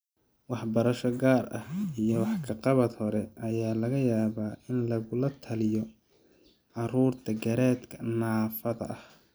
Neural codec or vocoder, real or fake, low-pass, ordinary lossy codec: none; real; none; none